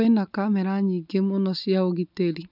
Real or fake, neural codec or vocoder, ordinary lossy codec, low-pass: real; none; none; 5.4 kHz